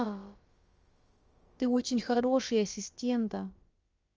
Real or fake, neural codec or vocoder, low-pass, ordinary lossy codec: fake; codec, 16 kHz, about 1 kbps, DyCAST, with the encoder's durations; 7.2 kHz; Opus, 24 kbps